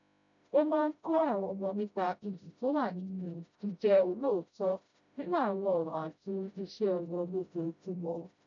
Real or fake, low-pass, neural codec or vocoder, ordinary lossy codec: fake; 7.2 kHz; codec, 16 kHz, 0.5 kbps, FreqCodec, smaller model; none